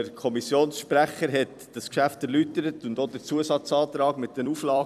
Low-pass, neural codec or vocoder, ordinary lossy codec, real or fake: 14.4 kHz; vocoder, 44.1 kHz, 128 mel bands every 256 samples, BigVGAN v2; none; fake